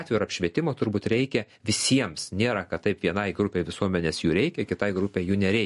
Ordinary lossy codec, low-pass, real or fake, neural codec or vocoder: MP3, 48 kbps; 14.4 kHz; real; none